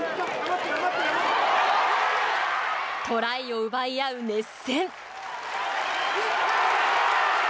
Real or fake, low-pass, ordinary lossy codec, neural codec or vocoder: real; none; none; none